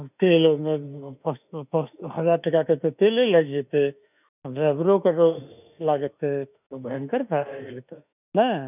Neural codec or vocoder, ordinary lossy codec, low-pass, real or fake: autoencoder, 48 kHz, 32 numbers a frame, DAC-VAE, trained on Japanese speech; none; 3.6 kHz; fake